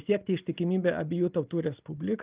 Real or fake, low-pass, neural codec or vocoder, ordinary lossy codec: real; 3.6 kHz; none; Opus, 32 kbps